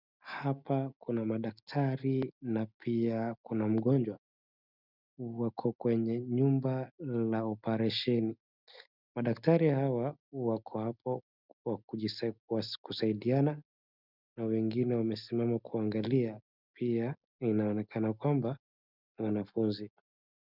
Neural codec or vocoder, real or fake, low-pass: none; real; 5.4 kHz